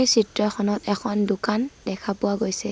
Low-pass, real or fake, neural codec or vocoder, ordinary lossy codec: none; real; none; none